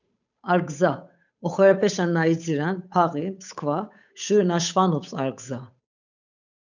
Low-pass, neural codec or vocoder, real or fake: 7.2 kHz; codec, 16 kHz, 8 kbps, FunCodec, trained on Chinese and English, 25 frames a second; fake